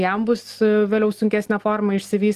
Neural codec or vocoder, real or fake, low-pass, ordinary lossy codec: none; real; 14.4 kHz; Opus, 24 kbps